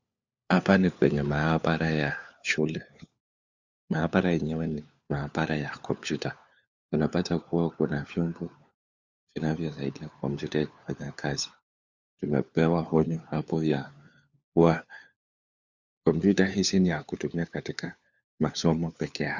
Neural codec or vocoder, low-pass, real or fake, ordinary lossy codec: codec, 16 kHz, 4 kbps, FunCodec, trained on LibriTTS, 50 frames a second; 7.2 kHz; fake; Opus, 64 kbps